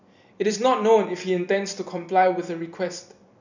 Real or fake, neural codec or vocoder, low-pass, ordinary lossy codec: fake; vocoder, 44.1 kHz, 128 mel bands every 256 samples, BigVGAN v2; 7.2 kHz; none